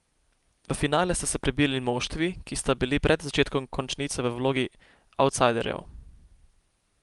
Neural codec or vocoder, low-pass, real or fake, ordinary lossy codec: none; 10.8 kHz; real; Opus, 32 kbps